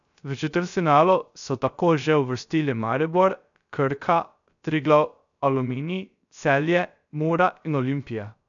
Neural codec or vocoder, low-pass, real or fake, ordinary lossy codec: codec, 16 kHz, 0.3 kbps, FocalCodec; 7.2 kHz; fake; none